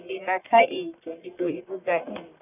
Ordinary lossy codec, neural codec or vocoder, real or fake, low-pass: none; codec, 44.1 kHz, 1.7 kbps, Pupu-Codec; fake; 3.6 kHz